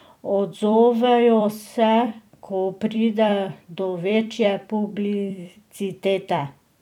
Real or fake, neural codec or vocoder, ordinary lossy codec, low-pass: fake; vocoder, 44.1 kHz, 128 mel bands every 256 samples, BigVGAN v2; none; 19.8 kHz